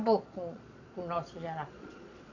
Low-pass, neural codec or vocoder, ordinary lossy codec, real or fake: 7.2 kHz; vocoder, 22.05 kHz, 80 mel bands, Vocos; AAC, 48 kbps; fake